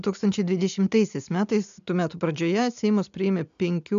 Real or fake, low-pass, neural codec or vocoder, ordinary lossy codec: real; 7.2 kHz; none; AAC, 96 kbps